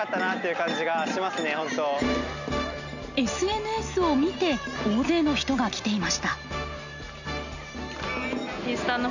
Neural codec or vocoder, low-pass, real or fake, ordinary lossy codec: none; 7.2 kHz; real; none